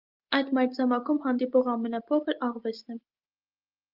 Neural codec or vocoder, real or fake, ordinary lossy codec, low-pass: none; real; Opus, 32 kbps; 5.4 kHz